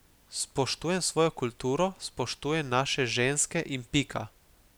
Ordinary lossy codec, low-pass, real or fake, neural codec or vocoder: none; none; real; none